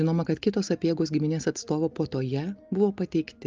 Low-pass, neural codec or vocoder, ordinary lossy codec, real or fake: 7.2 kHz; none; Opus, 24 kbps; real